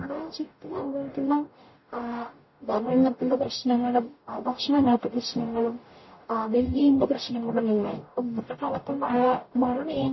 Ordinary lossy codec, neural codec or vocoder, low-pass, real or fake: MP3, 24 kbps; codec, 44.1 kHz, 0.9 kbps, DAC; 7.2 kHz; fake